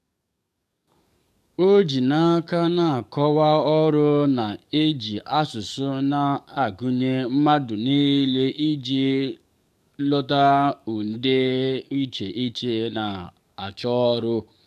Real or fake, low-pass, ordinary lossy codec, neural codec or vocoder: fake; 14.4 kHz; none; codec, 44.1 kHz, 7.8 kbps, DAC